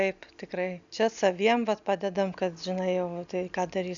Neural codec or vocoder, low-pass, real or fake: none; 7.2 kHz; real